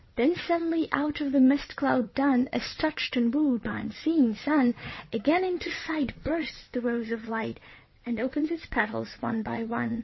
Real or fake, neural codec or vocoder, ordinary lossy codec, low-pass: fake; vocoder, 22.05 kHz, 80 mel bands, WaveNeXt; MP3, 24 kbps; 7.2 kHz